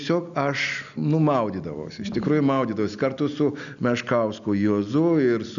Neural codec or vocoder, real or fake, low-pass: none; real; 7.2 kHz